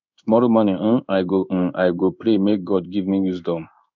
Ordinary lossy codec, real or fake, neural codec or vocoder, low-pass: none; fake; codec, 16 kHz in and 24 kHz out, 1 kbps, XY-Tokenizer; 7.2 kHz